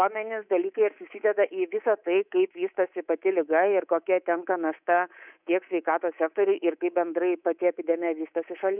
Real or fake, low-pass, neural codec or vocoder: fake; 3.6 kHz; codec, 24 kHz, 3.1 kbps, DualCodec